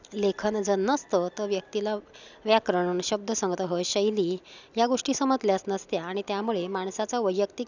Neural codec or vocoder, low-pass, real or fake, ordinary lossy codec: none; 7.2 kHz; real; none